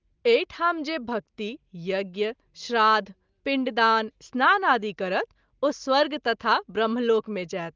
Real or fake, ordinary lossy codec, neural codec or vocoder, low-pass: real; Opus, 32 kbps; none; 7.2 kHz